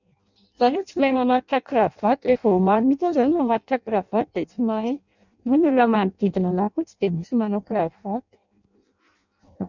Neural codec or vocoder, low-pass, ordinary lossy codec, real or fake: codec, 16 kHz in and 24 kHz out, 0.6 kbps, FireRedTTS-2 codec; 7.2 kHz; none; fake